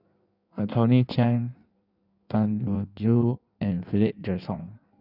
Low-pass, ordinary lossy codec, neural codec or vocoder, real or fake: 5.4 kHz; none; codec, 16 kHz in and 24 kHz out, 1.1 kbps, FireRedTTS-2 codec; fake